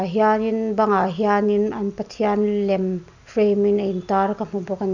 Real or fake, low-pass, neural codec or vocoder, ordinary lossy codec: real; 7.2 kHz; none; none